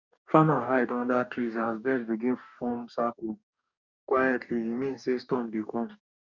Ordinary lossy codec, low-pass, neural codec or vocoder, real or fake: none; 7.2 kHz; codec, 44.1 kHz, 2.6 kbps, DAC; fake